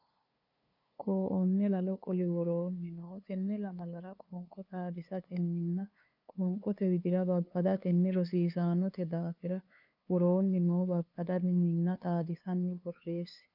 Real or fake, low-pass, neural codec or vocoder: fake; 5.4 kHz; codec, 16 kHz, 2 kbps, FunCodec, trained on LibriTTS, 25 frames a second